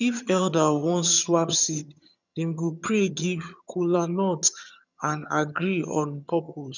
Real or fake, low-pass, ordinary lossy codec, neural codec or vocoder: fake; 7.2 kHz; none; vocoder, 22.05 kHz, 80 mel bands, HiFi-GAN